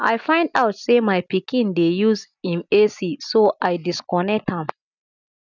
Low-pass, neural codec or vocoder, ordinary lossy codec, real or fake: 7.2 kHz; none; none; real